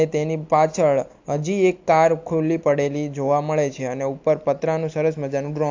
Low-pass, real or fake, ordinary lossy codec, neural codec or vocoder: 7.2 kHz; real; AAC, 48 kbps; none